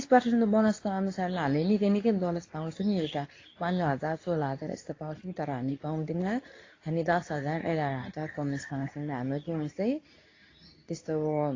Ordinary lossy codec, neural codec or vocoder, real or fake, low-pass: AAC, 32 kbps; codec, 24 kHz, 0.9 kbps, WavTokenizer, medium speech release version 2; fake; 7.2 kHz